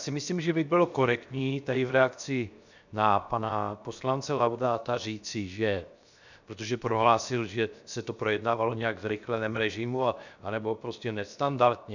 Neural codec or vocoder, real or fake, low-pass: codec, 16 kHz, 0.7 kbps, FocalCodec; fake; 7.2 kHz